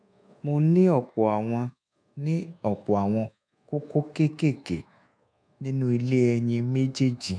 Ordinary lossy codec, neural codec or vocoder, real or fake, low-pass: none; codec, 24 kHz, 1.2 kbps, DualCodec; fake; 9.9 kHz